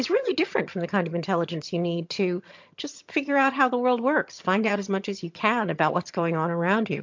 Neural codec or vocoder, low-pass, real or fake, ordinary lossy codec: vocoder, 22.05 kHz, 80 mel bands, HiFi-GAN; 7.2 kHz; fake; MP3, 48 kbps